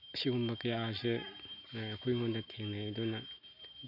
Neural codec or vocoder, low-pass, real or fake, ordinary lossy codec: none; 5.4 kHz; real; none